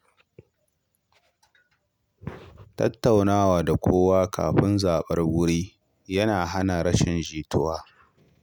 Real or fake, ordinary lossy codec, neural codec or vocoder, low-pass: real; none; none; none